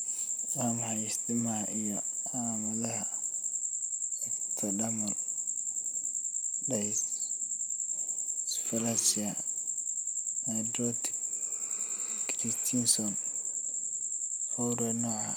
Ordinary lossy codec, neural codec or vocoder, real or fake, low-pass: none; none; real; none